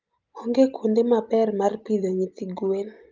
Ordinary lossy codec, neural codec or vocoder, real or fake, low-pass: Opus, 24 kbps; none; real; 7.2 kHz